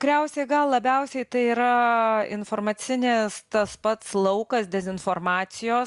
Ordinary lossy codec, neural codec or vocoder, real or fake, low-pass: Opus, 64 kbps; none; real; 10.8 kHz